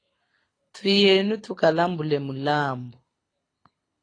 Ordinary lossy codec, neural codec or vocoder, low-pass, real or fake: AAC, 32 kbps; codec, 24 kHz, 6 kbps, HILCodec; 9.9 kHz; fake